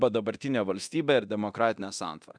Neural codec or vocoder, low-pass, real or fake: codec, 24 kHz, 0.9 kbps, DualCodec; 9.9 kHz; fake